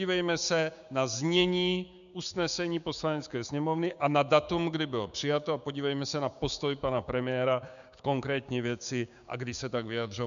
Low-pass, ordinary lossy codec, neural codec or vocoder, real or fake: 7.2 kHz; MP3, 96 kbps; codec, 16 kHz, 6 kbps, DAC; fake